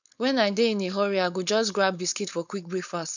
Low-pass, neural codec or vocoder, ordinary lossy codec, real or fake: 7.2 kHz; codec, 16 kHz, 4.8 kbps, FACodec; none; fake